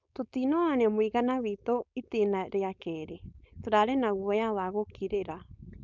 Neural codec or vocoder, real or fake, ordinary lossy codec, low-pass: codec, 16 kHz, 4.8 kbps, FACodec; fake; none; 7.2 kHz